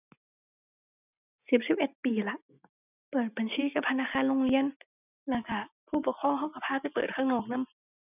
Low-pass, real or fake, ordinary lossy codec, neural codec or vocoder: 3.6 kHz; real; none; none